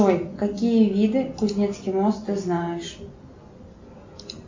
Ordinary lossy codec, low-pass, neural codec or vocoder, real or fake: MP3, 48 kbps; 7.2 kHz; none; real